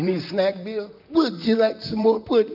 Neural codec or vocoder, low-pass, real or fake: vocoder, 44.1 kHz, 128 mel bands, Pupu-Vocoder; 5.4 kHz; fake